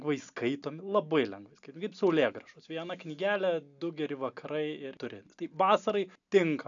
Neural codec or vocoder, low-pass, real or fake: none; 7.2 kHz; real